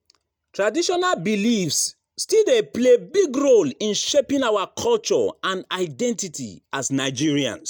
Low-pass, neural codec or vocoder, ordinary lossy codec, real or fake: none; none; none; real